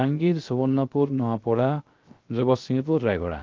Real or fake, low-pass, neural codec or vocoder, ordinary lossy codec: fake; 7.2 kHz; codec, 16 kHz, 0.3 kbps, FocalCodec; Opus, 32 kbps